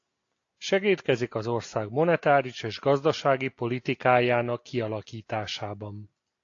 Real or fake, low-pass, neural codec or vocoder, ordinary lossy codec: real; 7.2 kHz; none; AAC, 48 kbps